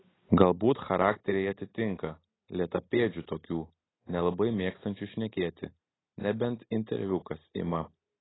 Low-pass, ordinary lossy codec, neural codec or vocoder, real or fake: 7.2 kHz; AAC, 16 kbps; none; real